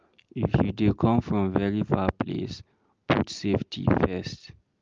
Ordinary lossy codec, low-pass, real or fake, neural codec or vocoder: Opus, 24 kbps; 7.2 kHz; real; none